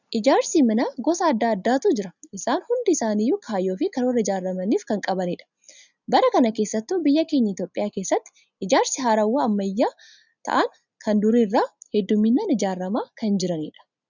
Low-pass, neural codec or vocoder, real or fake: 7.2 kHz; none; real